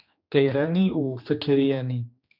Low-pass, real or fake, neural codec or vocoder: 5.4 kHz; fake; codec, 16 kHz, 2 kbps, X-Codec, HuBERT features, trained on general audio